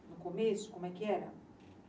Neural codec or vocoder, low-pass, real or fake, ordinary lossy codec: none; none; real; none